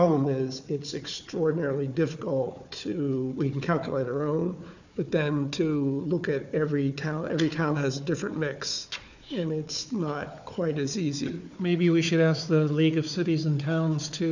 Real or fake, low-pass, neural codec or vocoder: fake; 7.2 kHz; codec, 16 kHz, 4 kbps, FunCodec, trained on Chinese and English, 50 frames a second